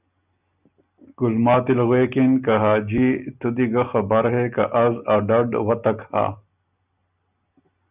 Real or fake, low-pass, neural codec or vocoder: real; 3.6 kHz; none